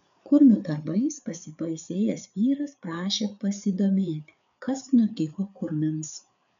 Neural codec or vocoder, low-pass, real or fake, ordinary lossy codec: codec, 16 kHz, 4 kbps, FreqCodec, larger model; 7.2 kHz; fake; MP3, 96 kbps